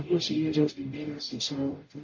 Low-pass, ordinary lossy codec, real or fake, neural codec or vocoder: 7.2 kHz; MP3, 32 kbps; fake; codec, 44.1 kHz, 0.9 kbps, DAC